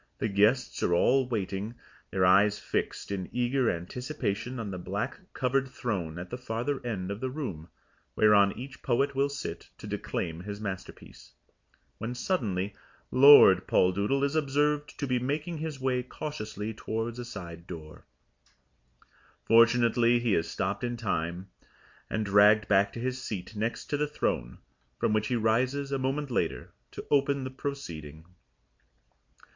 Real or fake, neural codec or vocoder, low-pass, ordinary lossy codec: real; none; 7.2 kHz; MP3, 64 kbps